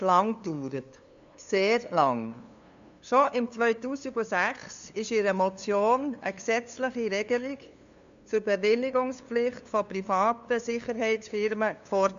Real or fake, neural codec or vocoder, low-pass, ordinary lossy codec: fake; codec, 16 kHz, 2 kbps, FunCodec, trained on LibriTTS, 25 frames a second; 7.2 kHz; MP3, 64 kbps